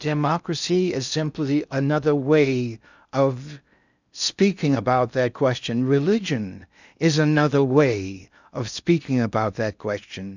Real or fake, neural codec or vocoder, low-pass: fake; codec, 16 kHz in and 24 kHz out, 0.6 kbps, FocalCodec, streaming, 2048 codes; 7.2 kHz